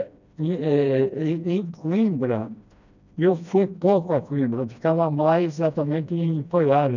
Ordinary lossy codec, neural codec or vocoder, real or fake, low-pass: none; codec, 16 kHz, 1 kbps, FreqCodec, smaller model; fake; 7.2 kHz